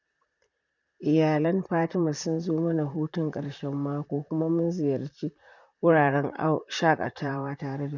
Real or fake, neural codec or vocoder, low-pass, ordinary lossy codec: fake; vocoder, 44.1 kHz, 128 mel bands, Pupu-Vocoder; 7.2 kHz; none